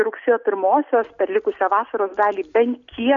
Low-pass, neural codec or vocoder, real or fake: 7.2 kHz; none; real